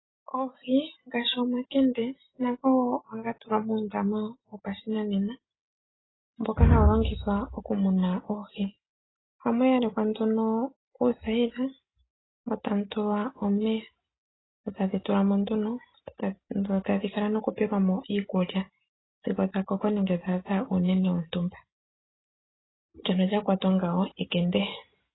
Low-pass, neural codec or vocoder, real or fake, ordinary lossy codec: 7.2 kHz; none; real; AAC, 16 kbps